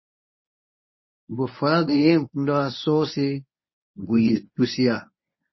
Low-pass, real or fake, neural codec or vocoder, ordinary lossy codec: 7.2 kHz; fake; codec, 24 kHz, 0.9 kbps, WavTokenizer, medium speech release version 1; MP3, 24 kbps